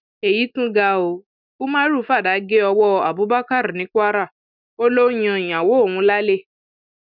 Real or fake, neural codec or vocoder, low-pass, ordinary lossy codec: real; none; 5.4 kHz; none